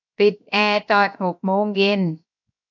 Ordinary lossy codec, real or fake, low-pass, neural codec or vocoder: none; fake; 7.2 kHz; codec, 16 kHz, 0.7 kbps, FocalCodec